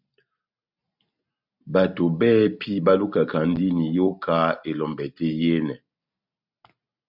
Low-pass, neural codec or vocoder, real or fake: 5.4 kHz; none; real